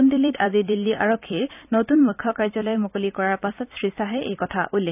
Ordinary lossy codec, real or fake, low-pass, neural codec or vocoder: none; fake; 3.6 kHz; vocoder, 44.1 kHz, 128 mel bands every 256 samples, BigVGAN v2